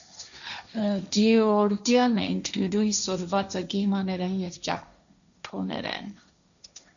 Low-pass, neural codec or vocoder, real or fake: 7.2 kHz; codec, 16 kHz, 1.1 kbps, Voila-Tokenizer; fake